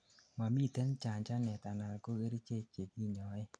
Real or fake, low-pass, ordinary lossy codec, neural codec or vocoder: real; 9.9 kHz; none; none